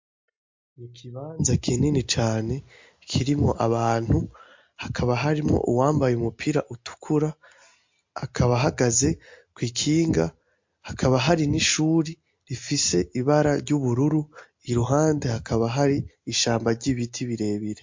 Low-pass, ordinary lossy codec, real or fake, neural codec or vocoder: 7.2 kHz; MP3, 48 kbps; real; none